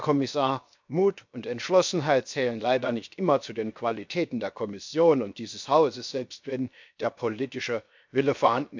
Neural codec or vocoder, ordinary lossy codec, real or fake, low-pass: codec, 16 kHz, 0.7 kbps, FocalCodec; MP3, 64 kbps; fake; 7.2 kHz